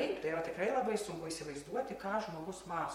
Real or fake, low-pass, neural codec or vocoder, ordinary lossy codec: fake; 19.8 kHz; vocoder, 44.1 kHz, 128 mel bands, Pupu-Vocoder; MP3, 64 kbps